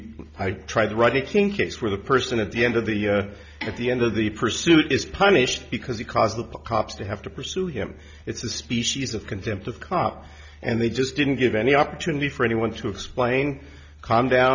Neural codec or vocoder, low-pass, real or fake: none; 7.2 kHz; real